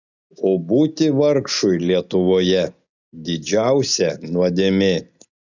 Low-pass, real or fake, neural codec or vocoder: 7.2 kHz; real; none